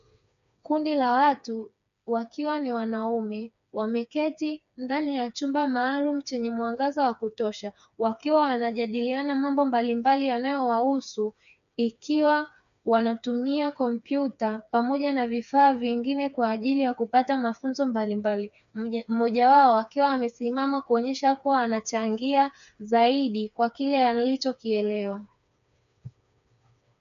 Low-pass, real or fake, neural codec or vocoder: 7.2 kHz; fake; codec, 16 kHz, 4 kbps, FreqCodec, smaller model